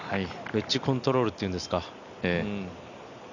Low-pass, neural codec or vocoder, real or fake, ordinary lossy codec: 7.2 kHz; none; real; none